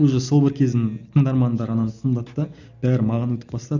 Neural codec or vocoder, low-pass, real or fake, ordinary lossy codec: none; 7.2 kHz; real; none